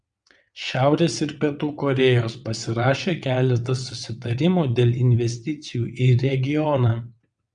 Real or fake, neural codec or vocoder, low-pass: fake; vocoder, 22.05 kHz, 80 mel bands, WaveNeXt; 9.9 kHz